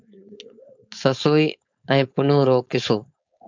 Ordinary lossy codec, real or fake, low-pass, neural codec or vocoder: MP3, 64 kbps; fake; 7.2 kHz; codec, 16 kHz, 4.8 kbps, FACodec